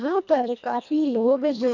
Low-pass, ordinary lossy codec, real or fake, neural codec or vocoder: 7.2 kHz; MP3, 64 kbps; fake; codec, 24 kHz, 1.5 kbps, HILCodec